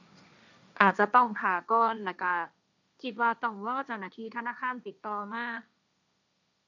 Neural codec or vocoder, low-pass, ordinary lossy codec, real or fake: codec, 16 kHz, 1.1 kbps, Voila-Tokenizer; 7.2 kHz; none; fake